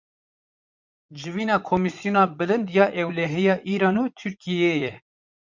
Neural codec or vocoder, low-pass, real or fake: vocoder, 44.1 kHz, 80 mel bands, Vocos; 7.2 kHz; fake